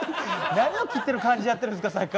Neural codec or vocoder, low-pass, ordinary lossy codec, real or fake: none; none; none; real